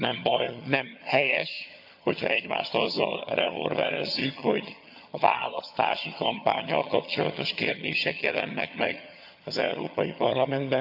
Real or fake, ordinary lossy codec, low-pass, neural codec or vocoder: fake; AAC, 48 kbps; 5.4 kHz; vocoder, 22.05 kHz, 80 mel bands, HiFi-GAN